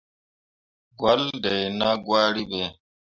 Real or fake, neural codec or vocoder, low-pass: real; none; 7.2 kHz